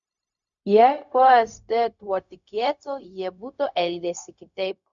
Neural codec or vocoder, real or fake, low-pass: codec, 16 kHz, 0.4 kbps, LongCat-Audio-Codec; fake; 7.2 kHz